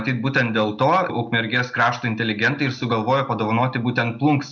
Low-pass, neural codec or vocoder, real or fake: 7.2 kHz; none; real